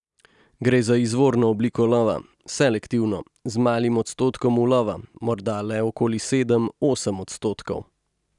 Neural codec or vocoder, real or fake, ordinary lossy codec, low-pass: none; real; none; 10.8 kHz